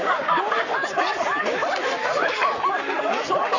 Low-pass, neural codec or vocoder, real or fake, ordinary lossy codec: 7.2 kHz; codec, 44.1 kHz, 7.8 kbps, Pupu-Codec; fake; none